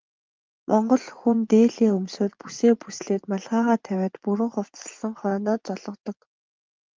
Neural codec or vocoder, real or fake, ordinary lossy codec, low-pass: vocoder, 44.1 kHz, 80 mel bands, Vocos; fake; Opus, 32 kbps; 7.2 kHz